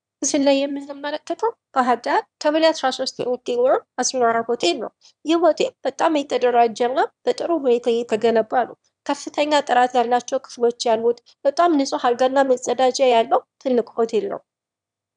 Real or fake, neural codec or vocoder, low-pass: fake; autoencoder, 22.05 kHz, a latent of 192 numbers a frame, VITS, trained on one speaker; 9.9 kHz